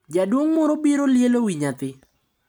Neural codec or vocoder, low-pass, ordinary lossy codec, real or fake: none; none; none; real